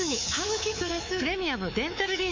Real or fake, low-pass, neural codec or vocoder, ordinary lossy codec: fake; 7.2 kHz; codec, 24 kHz, 3.1 kbps, DualCodec; MP3, 48 kbps